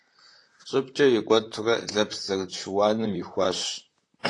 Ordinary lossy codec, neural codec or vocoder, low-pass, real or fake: AAC, 48 kbps; vocoder, 44.1 kHz, 128 mel bands, Pupu-Vocoder; 10.8 kHz; fake